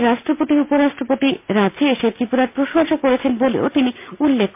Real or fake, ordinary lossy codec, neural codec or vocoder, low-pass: real; MP3, 32 kbps; none; 3.6 kHz